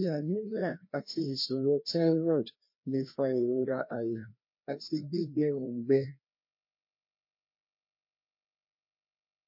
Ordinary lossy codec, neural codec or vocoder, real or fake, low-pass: MP3, 32 kbps; codec, 16 kHz, 1 kbps, FreqCodec, larger model; fake; 5.4 kHz